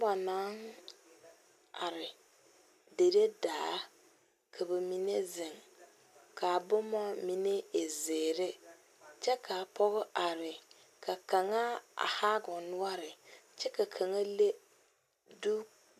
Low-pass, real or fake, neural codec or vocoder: 14.4 kHz; real; none